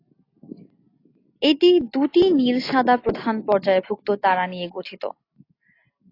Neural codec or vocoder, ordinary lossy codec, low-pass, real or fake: none; AAC, 32 kbps; 5.4 kHz; real